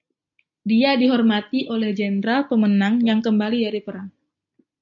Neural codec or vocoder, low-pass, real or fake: none; 7.2 kHz; real